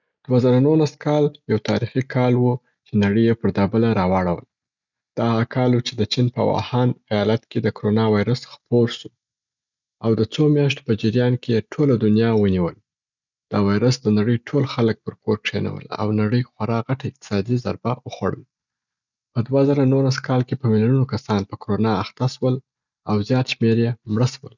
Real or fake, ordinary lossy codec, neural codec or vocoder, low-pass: real; none; none; 7.2 kHz